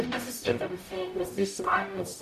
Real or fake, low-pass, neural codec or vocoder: fake; 14.4 kHz; codec, 44.1 kHz, 0.9 kbps, DAC